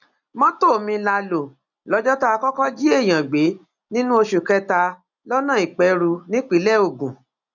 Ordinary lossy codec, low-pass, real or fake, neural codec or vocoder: none; 7.2 kHz; real; none